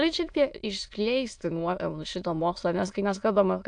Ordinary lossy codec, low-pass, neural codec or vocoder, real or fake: AAC, 64 kbps; 9.9 kHz; autoencoder, 22.05 kHz, a latent of 192 numbers a frame, VITS, trained on many speakers; fake